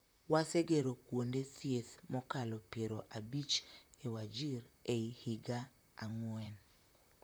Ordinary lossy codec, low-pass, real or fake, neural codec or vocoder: none; none; fake; vocoder, 44.1 kHz, 128 mel bands, Pupu-Vocoder